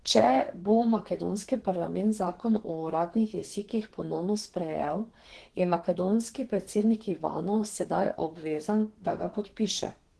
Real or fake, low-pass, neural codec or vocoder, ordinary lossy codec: fake; 10.8 kHz; codec, 32 kHz, 1.9 kbps, SNAC; Opus, 16 kbps